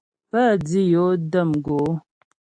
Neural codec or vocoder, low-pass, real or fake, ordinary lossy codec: none; 9.9 kHz; real; AAC, 64 kbps